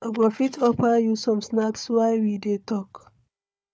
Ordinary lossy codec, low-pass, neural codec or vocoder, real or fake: none; none; codec, 16 kHz, 4 kbps, FunCodec, trained on Chinese and English, 50 frames a second; fake